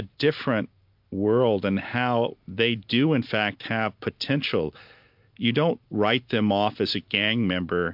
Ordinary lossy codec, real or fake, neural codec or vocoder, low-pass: MP3, 48 kbps; real; none; 5.4 kHz